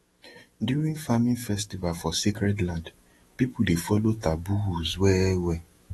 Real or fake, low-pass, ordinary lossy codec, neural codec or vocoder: fake; 19.8 kHz; AAC, 32 kbps; autoencoder, 48 kHz, 128 numbers a frame, DAC-VAE, trained on Japanese speech